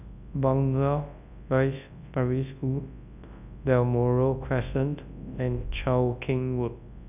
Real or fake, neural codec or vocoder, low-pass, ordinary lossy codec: fake; codec, 24 kHz, 0.9 kbps, WavTokenizer, large speech release; 3.6 kHz; none